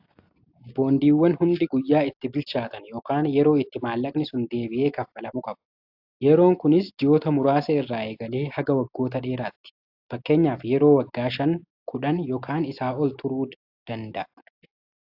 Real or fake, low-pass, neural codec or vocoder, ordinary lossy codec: real; 5.4 kHz; none; AAC, 48 kbps